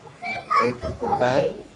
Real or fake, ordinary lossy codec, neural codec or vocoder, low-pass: fake; AAC, 64 kbps; codec, 44.1 kHz, 3.4 kbps, Pupu-Codec; 10.8 kHz